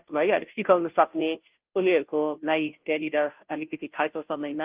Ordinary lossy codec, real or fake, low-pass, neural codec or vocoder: Opus, 32 kbps; fake; 3.6 kHz; codec, 16 kHz, 0.5 kbps, FunCodec, trained on Chinese and English, 25 frames a second